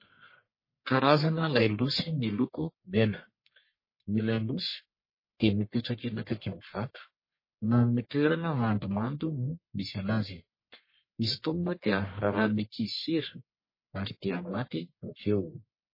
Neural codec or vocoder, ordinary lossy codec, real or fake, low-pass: codec, 44.1 kHz, 1.7 kbps, Pupu-Codec; MP3, 24 kbps; fake; 5.4 kHz